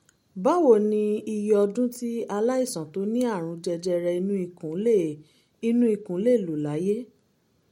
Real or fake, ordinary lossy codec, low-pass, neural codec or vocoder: real; MP3, 64 kbps; 19.8 kHz; none